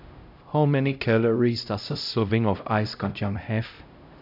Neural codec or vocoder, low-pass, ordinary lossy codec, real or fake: codec, 16 kHz, 0.5 kbps, X-Codec, HuBERT features, trained on LibriSpeech; 5.4 kHz; none; fake